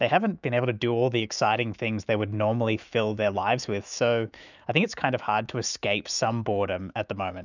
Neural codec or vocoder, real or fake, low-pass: autoencoder, 48 kHz, 128 numbers a frame, DAC-VAE, trained on Japanese speech; fake; 7.2 kHz